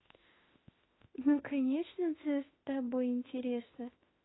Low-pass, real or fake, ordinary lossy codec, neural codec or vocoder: 7.2 kHz; fake; AAC, 16 kbps; autoencoder, 48 kHz, 32 numbers a frame, DAC-VAE, trained on Japanese speech